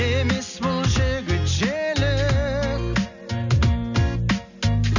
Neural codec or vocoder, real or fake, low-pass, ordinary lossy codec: none; real; 7.2 kHz; none